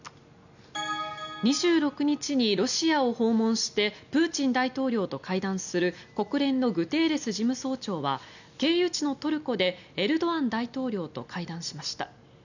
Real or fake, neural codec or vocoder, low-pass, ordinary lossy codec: real; none; 7.2 kHz; none